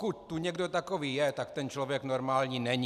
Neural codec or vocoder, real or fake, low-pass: none; real; 14.4 kHz